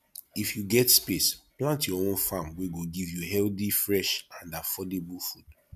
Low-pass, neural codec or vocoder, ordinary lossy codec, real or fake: 14.4 kHz; none; MP3, 96 kbps; real